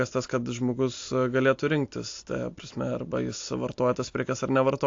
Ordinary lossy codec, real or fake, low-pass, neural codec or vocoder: AAC, 48 kbps; real; 7.2 kHz; none